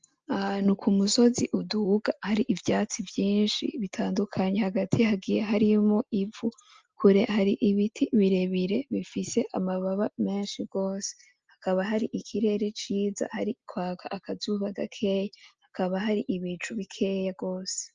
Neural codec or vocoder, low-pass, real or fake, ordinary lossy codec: none; 7.2 kHz; real; Opus, 24 kbps